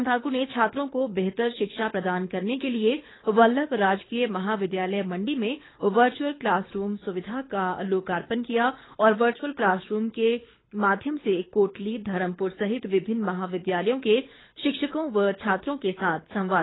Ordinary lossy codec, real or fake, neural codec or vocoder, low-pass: AAC, 16 kbps; fake; codec, 24 kHz, 6 kbps, HILCodec; 7.2 kHz